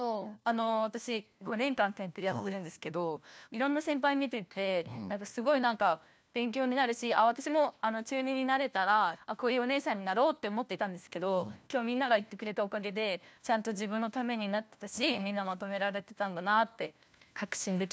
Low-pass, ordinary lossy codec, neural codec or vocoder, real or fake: none; none; codec, 16 kHz, 1 kbps, FunCodec, trained on LibriTTS, 50 frames a second; fake